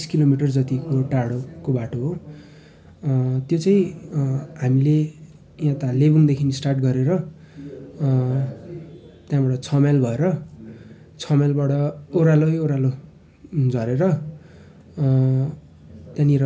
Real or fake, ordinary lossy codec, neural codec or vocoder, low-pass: real; none; none; none